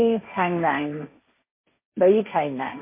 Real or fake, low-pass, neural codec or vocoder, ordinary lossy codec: fake; 3.6 kHz; codec, 16 kHz, 1.1 kbps, Voila-Tokenizer; MP3, 24 kbps